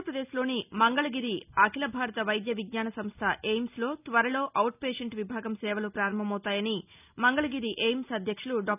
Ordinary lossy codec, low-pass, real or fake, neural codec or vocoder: none; 3.6 kHz; real; none